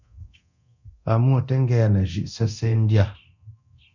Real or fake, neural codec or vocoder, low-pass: fake; codec, 24 kHz, 0.9 kbps, DualCodec; 7.2 kHz